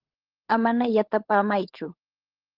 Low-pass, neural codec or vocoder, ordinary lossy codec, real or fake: 5.4 kHz; codec, 16 kHz, 16 kbps, FunCodec, trained on LibriTTS, 50 frames a second; Opus, 16 kbps; fake